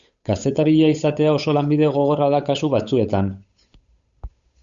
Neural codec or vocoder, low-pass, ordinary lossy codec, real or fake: codec, 16 kHz, 8 kbps, FunCodec, trained on Chinese and English, 25 frames a second; 7.2 kHz; Opus, 64 kbps; fake